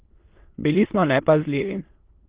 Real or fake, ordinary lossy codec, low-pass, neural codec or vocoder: fake; Opus, 16 kbps; 3.6 kHz; autoencoder, 22.05 kHz, a latent of 192 numbers a frame, VITS, trained on many speakers